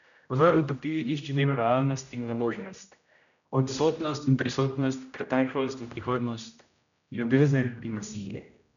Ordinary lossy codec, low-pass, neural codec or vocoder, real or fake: none; 7.2 kHz; codec, 16 kHz, 0.5 kbps, X-Codec, HuBERT features, trained on general audio; fake